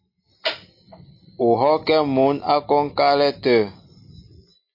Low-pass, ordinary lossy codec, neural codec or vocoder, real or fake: 5.4 kHz; MP3, 32 kbps; none; real